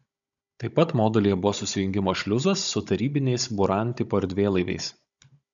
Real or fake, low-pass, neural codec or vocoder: fake; 7.2 kHz; codec, 16 kHz, 16 kbps, FunCodec, trained on Chinese and English, 50 frames a second